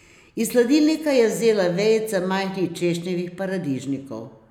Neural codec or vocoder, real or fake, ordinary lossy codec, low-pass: none; real; none; 19.8 kHz